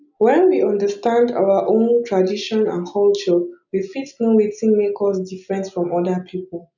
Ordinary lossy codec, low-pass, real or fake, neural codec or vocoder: none; 7.2 kHz; real; none